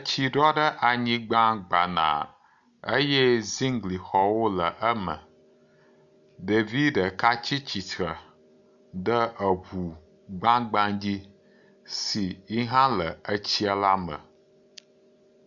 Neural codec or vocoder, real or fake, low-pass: none; real; 7.2 kHz